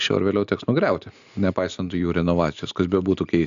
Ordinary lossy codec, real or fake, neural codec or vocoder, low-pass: AAC, 96 kbps; real; none; 7.2 kHz